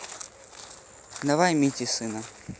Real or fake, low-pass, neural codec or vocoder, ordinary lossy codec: real; none; none; none